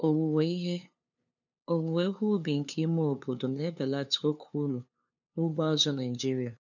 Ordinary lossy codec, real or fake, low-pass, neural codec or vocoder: none; fake; 7.2 kHz; codec, 16 kHz, 2 kbps, FunCodec, trained on LibriTTS, 25 frames a second